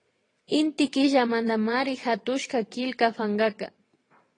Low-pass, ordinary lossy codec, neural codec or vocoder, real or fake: 9.9 kHz; AAC, 32 kbps; vocoder, 22.05 kHz, 80 mel bands, WaveNeXt; fake